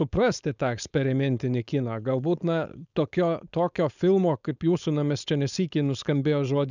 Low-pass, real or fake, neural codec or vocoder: 7.2 kHz; fake; codec, 16 kHz, 4.8 kbps, FACodec